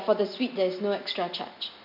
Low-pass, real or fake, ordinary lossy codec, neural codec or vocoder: 5.4 kHz; real; AAC, 48 kbps; none